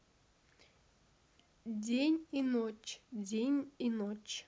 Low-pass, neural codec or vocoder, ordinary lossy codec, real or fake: none; none; none; real